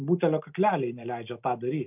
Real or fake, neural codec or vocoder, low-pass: real; none; 3.6 kHz